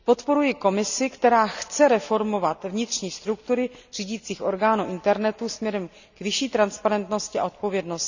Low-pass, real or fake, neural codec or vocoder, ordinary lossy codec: 7.2 kHz; real; none; none